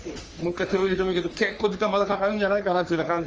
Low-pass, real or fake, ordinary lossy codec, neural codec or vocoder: 7.2 kHz; fake; Opus, 24 kbps; codec, 44.1 kHz, 3.4 kbps, Pupu-Codec